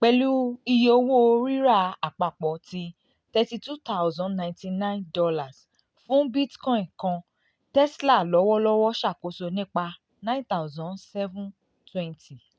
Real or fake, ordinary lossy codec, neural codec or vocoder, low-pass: real; none; none; none